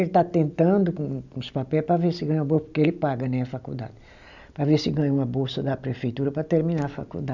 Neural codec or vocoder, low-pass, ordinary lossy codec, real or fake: none; 7.2 kHz; none; real